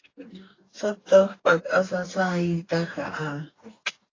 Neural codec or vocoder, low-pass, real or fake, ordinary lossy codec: codec, 44.1 kHz, 2.6 kbps, DAC; 7.2 kHz; fake; AAC, 32 kbps